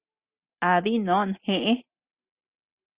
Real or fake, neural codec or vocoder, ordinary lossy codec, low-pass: real; none; Opus, 64 kbps; 3.6 kHz